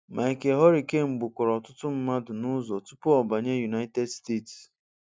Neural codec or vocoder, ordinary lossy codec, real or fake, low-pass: none; none; real; none